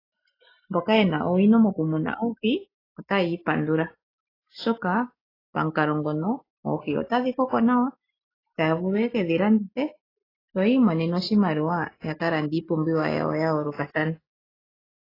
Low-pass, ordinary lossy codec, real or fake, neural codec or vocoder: 5.4 kHz; AAC, 24 kbps; real; none